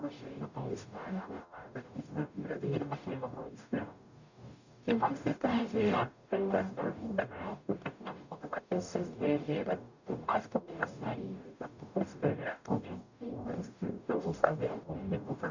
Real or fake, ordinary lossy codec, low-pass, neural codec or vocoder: fake; none; 7.2 kHz; codec, 44.1 kHz, 0.9 kbps, DAC